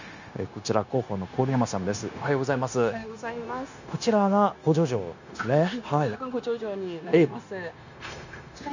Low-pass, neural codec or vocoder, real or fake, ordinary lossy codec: 7.2 kHz; codec, 16 kHz, 0.9 kbps, LongCat-Audio-Codec; fake; none